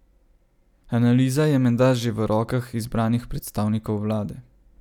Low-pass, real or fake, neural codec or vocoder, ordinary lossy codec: 19.8 kHz; real; none; none